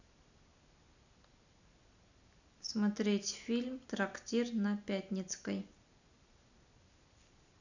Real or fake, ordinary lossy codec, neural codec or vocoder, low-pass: real; none; none; 7.2 kHz